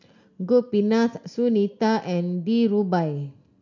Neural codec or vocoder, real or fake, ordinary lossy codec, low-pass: none; real; none; 7.2 kHz